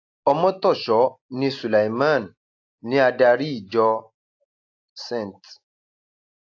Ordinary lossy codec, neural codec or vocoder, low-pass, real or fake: AAC, 48 kbps; none; 7.2 kHz; real